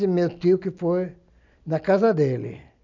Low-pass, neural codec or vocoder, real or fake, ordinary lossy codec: 7.2 kHz; none; real; none